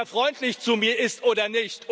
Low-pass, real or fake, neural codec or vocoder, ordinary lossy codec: none; real; none; none